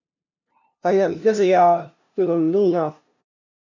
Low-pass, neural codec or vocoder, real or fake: 7.2 kHz; codec, 16 kHz, 0.5 kbps, FunCodec, trained on LibriTTS, 25 frames a second; fake